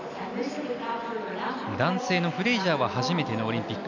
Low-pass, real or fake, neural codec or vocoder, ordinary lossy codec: 7.2 kHz; real; none; none